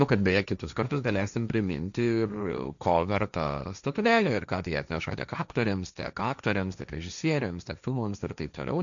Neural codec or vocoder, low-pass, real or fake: codec, 16 kHz, 1.1 kbps, Voila-Tokenizer; 7.2 kHz; fake